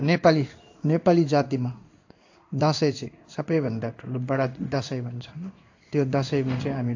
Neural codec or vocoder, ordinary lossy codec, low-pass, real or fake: codec, 16 kHz in and 24 kHz out, 1 kbps, XY-Tokenizer; AAC, 48 kbps; 7.2 kHz; fake